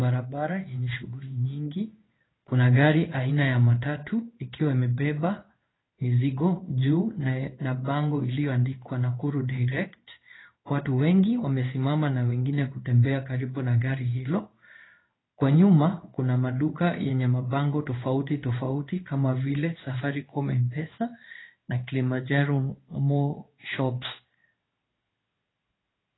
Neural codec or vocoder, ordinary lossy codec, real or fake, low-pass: codec, 16 kHz in and 24 kHz out, 1 kbps, XY-Tokenizer; AAC, 16 kbps; fake; 7.2 kHz